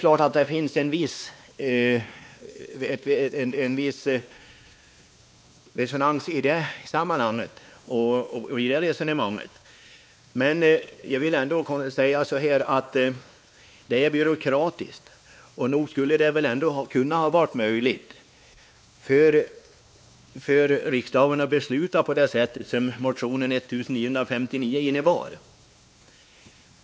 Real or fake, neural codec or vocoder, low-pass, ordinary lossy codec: fake; codec, 16 kHz, 2 kbps, X-Codec, WavLM features, trained on Multilingual LibriSpeech; none; none